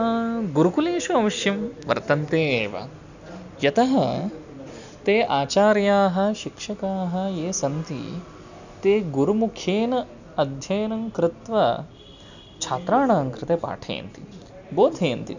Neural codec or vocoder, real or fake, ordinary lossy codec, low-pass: none; real; none; 7.2 kHz